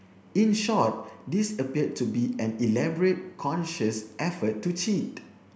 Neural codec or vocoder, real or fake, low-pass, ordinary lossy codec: none; real; none; none